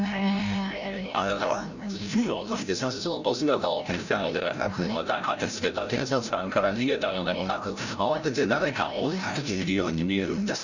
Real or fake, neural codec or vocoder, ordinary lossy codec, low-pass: fake; codec, 16 kHz, 0.5 kbps, FreqCodec, larger model; none; 7.2 kHz